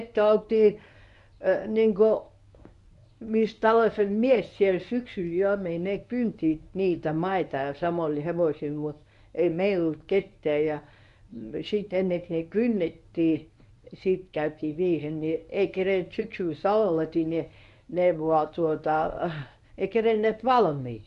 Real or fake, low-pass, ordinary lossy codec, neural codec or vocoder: fake; 10.8 kHz; none; codec, 24 kHz, 0.9 kbps, WavTokenizer, medium speech release version 1